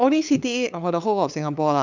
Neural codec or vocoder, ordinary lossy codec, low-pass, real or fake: codec, 16 kHz, 8 kbps, FunCodec, trained on LibriTTS, 25 frames a second; none; 7.2 kHz; fake